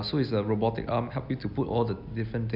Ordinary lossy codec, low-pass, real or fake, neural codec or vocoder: none; 5.4 kHz; real; none